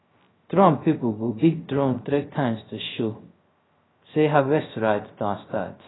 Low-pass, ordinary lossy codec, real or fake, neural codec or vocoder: 7.2 kHz; AAC, 16 kbps; fake; codec, 16 kHz, 0.3 kbps, FocalCodec